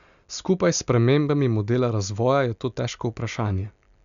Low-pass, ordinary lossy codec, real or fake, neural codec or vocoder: 7.2 kHz; none; real; none